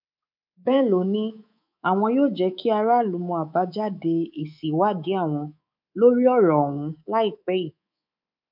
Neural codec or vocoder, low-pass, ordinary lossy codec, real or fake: codec, 24 kHz, 3.1 kbps, DualCodec; 5.4 kHz; none; fake